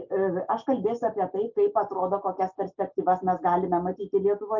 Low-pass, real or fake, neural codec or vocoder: 7.2 kHz; real; none